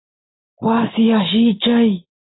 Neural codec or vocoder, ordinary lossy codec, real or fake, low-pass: none; AAC, 16 kbps; real; 7.2 kHz